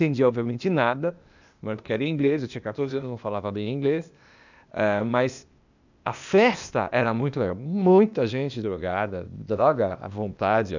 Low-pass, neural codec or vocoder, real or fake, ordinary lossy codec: 7.2 kHz; codec, 16 kHz, 0.8 kbps, ZipCodec; fake; none